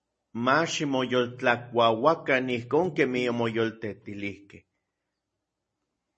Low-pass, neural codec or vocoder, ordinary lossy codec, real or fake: 10.8 kHz; none; MP3, 32 kbps; real